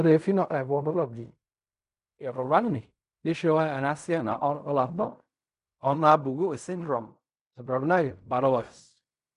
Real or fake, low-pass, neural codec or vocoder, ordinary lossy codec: fake; 10.8 kHz; codec, 16 kHz in and 24 kHz out, 0.4 kbps, LongCat-Audio-Codec, fine tuned four codebook decoder; none